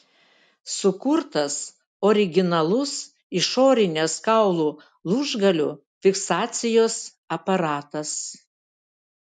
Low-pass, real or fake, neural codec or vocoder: 10.8 kHz; real; none